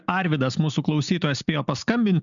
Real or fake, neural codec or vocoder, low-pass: real; none; 7.2 kHz